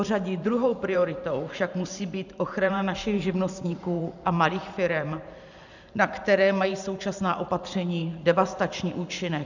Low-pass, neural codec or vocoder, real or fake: 7.2 kHz; vocoder, 44.1 kHz, 128 mel bands every 512 samples, BigVGAN v2; fake